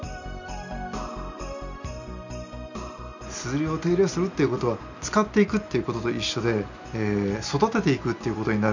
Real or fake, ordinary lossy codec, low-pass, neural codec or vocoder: real; none; 7.2 kHz; none